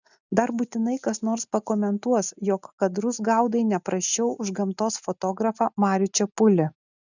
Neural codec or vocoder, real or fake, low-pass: none; real; 7.2 kHz